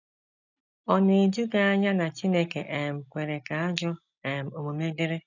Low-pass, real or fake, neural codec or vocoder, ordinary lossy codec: 7.2 kHz; real; none; none